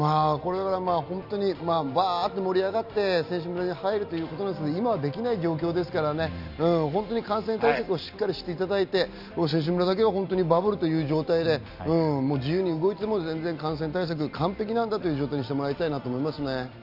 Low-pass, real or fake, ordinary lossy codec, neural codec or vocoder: 5.4 kHz; real; none; none